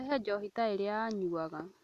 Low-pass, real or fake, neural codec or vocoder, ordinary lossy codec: 14.4 kHz; real; none; none